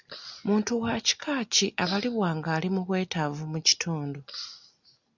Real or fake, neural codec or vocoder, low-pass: real; none; 7.2 kHz